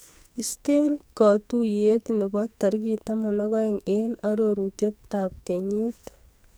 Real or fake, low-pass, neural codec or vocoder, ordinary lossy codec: fake; none; codec, 44.1 kHz, 2.6 kbps, SNAC; none